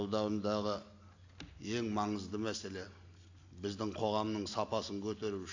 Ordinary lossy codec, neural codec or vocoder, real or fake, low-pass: AAC, 48 kbps; none; real; 7.2 kHz